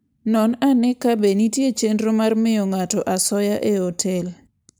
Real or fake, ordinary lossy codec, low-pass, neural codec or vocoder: real; none; none; none